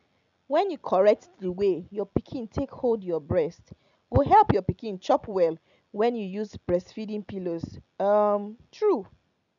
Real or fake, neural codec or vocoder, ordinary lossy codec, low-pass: real; none; none; 7.2 kHz